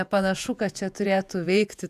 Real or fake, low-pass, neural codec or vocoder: fake; 14.4 kHz; vocoder, 48 kHz, 128 mel bands, Vocos